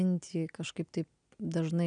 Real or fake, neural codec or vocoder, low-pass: real; none; 9.9 kHz